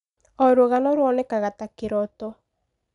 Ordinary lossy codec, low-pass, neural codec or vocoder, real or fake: none; 10.8 kHz; none; real